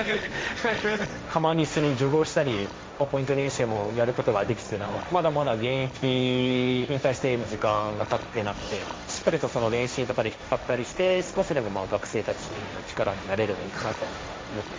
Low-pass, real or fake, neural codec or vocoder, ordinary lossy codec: none; fake; codec, 16 kHz, 1.1 kbps, Voila-Tokenizer; none